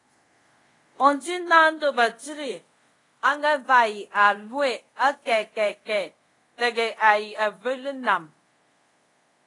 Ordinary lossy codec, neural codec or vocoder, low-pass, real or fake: AAC, 32 kbps; codec, 24 kHz, 0.5 kbps, DualCodec; 10.8 kHz; fake